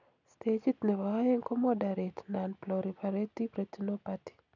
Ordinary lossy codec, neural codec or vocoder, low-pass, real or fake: none; none; 7.2 kHz; real